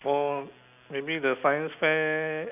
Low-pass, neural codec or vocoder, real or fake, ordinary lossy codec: 3.6 kHz; none; real; none